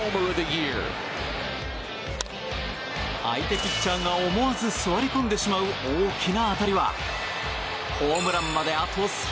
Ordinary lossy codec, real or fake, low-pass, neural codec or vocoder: none; real; none; none